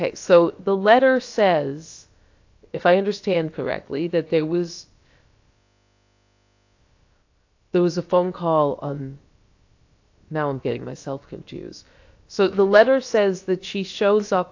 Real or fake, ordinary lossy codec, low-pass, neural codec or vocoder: fake; AAC, 48 kbps; 7.2 kHz; codec, 16 kHz, about 1 kbps, DyCAST, with the encoder's durations